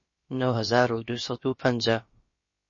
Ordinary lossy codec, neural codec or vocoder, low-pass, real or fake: MP3, 32 kbps; codec, 16 kHz, about 1 kbps, DyCAST, with the encoder's durations; 7.2 kHz; fake